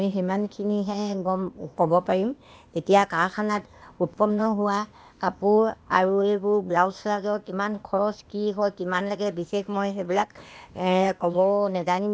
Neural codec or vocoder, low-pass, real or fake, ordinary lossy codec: codec, 16 kHz, 0.8 kbps, ZipCodec; none; fake; none